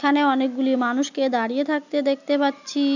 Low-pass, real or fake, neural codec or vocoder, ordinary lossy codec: 7.2 kHz; real; none; none